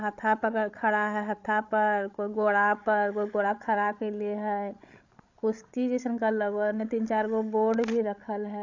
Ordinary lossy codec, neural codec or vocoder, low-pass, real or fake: AAC, 48 kbps; codec, 16 kHz, 16 kbps, FreqCodec, larger model; 7.2 kHz; fake